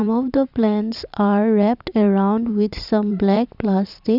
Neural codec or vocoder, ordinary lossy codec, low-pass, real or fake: vocoder, 44.1 kHz, 128 mel bands every 512 samples, BigVGAN v2; none; 5.4 kHz; fake